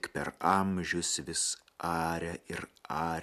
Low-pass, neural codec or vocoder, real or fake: 14.4 kHz; none; real